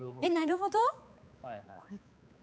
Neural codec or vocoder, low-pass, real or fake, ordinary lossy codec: codec, 16 kHz, 4 kbps, X-Codec, HuBERT features, trained on balanced general audio; none; fake; none